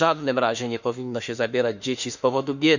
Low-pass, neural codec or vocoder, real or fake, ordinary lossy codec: 7.2 kHz; autoencoder, 48 kHz, 32 numbers a frame, DAC-VAE, trained on Japanese speech; fake; none